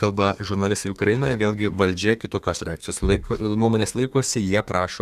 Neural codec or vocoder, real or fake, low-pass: codec, 32 kHz, 1.9 kbps, SNAC; fake; 14.4 kHz